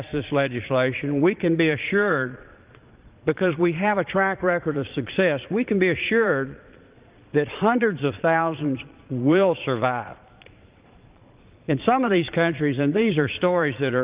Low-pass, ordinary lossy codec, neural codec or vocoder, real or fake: 3.6 kHz; Opus, 24 kbps; vocoder, 22.05 kHz, 80 mel bands, Vocos; fake